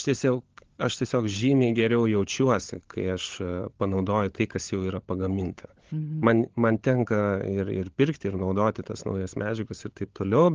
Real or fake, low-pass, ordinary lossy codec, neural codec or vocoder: fake; 7.2 kHz; Opus, 16 kbps; codec, 16 kHz, 16 kbps, FunCodec, trained on LibriTTS, 50 frames a second